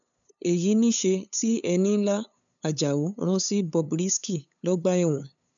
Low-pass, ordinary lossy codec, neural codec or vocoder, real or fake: 7.2 kHz; none; codec, 16 kHz, 8 kbps, FunCodec, trained on LibriTTS, 25 frames a second; fake